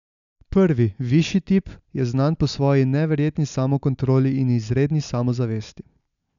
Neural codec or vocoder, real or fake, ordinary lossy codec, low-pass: none; real; none; 7.2 kHz